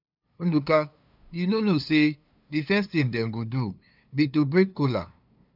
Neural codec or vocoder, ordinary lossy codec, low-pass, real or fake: codec, 16 kHz, 2 kbps, FunCodec, trained on LibriTTS, 25 frames a second; none; 5.4 kHz; fake